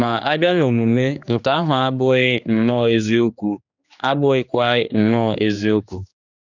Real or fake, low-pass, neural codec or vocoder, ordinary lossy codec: fake; 7.2 kHz; codec, 16 kHz, 2 kbps, X-Codec, HuBERT features, trained on general audio; none